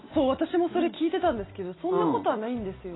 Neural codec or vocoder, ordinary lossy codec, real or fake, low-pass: none; AAC, 16 kbps; real; 7.2 kHz